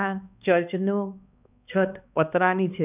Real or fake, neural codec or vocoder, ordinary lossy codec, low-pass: fake; codec, 16 kHz, 2 kbps, X-Codec, HuBERT features, trained on balanced general audio; none; 3.6 kHz